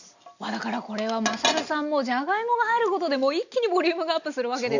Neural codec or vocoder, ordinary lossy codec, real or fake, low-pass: none; none; real; 7.2 kHz